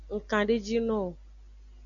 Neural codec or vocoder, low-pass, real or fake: none; 7.2 kHz; real